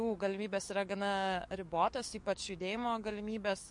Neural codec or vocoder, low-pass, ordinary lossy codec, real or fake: codec, 44.1 kHz, 7.8 kbps, DAC; 10.8 kHz; MP3, 48 kbps; fake